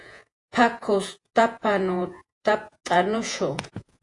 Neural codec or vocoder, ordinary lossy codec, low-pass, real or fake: vocoder, 48 kHz, 128 mel bands, Vocos; AAC, 48 kbps; 10.8 kHz; fake